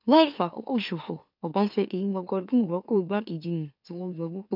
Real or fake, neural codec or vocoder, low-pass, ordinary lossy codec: fake; autoencoder, 44.1 kHz, a latent of 192 numbers a frame, MeloTTS; 5.4 kHz; none